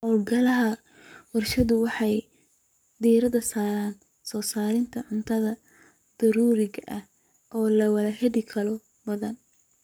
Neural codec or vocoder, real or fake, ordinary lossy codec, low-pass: codec, 44.1 kHz, 7.8 kbps, Pupu-Codec; fake; none; none